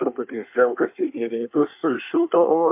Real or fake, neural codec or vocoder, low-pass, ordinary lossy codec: fake; codec, 24 kHz, 1 kbps, SNAC; 3.6 kHz; MP3, 32 kbps